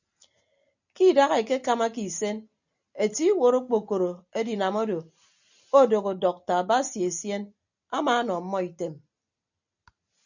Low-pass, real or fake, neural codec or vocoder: 7.2 kHz; real; none